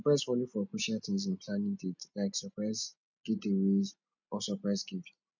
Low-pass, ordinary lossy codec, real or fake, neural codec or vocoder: 7.2 kHz; none; real; none